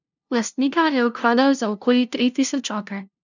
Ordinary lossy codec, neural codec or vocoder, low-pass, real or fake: none; codec, 16 kHz, 0.5 kbps, FunCodec, trained on LibriTTS, 25 frames a second; 7.2 kHz; fake